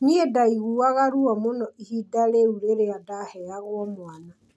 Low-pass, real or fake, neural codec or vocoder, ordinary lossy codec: none; real; none; none